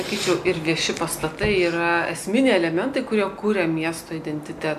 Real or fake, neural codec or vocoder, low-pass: real; none; 14.4 kHz